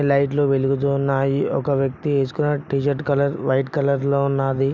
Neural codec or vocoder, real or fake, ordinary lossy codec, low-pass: none; real; none; none